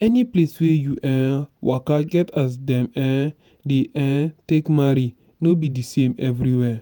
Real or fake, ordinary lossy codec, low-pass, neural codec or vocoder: fake; none; none; vocoder, 48 kHz, 128 mel bands, Vocos